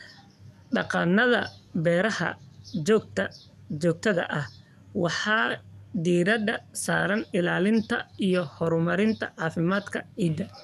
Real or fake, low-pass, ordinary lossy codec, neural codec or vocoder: fake; 14.4 kHz; none; codec, 44.1 kHz, 7.8 kbps, DAC